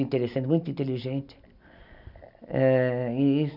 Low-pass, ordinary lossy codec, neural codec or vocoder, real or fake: 5.4 kHz; none; none; real